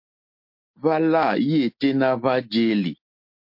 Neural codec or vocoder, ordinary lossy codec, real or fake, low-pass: none; MP3, 32 kbps; real; 5.4 kHz